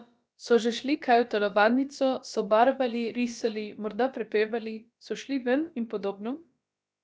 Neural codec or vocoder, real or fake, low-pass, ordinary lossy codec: codec, 16 kHz, about 1 kbps, DyCAST, with the encoder's durations; fake; none; none